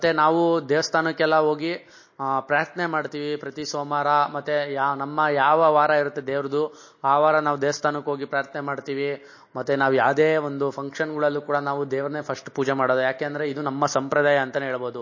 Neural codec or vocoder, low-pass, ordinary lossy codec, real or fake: none; 7.2 kHz; MP3, 32 kbps; real